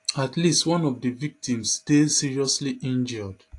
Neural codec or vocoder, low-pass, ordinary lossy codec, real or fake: none; 10.8 kHz; AAC, 48 kbps; real